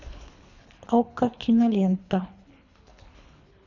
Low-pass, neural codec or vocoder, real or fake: 7.2 kHz; codec, 24 kHz, 3 kbps, HILCodec; fake